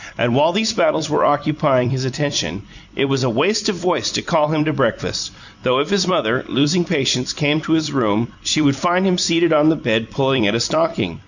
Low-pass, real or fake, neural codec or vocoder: 7.2 kHz; fake; vocoder, 44.1 kHz, 128 mel bands every 256 samples, BigVGAN v2